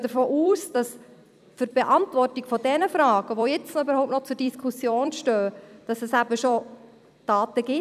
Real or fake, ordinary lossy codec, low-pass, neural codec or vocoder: fake; none; 14.4 kHz; vocoder, 44.1 kHz, 128 mel bands every 256 samples, BigVGAN v2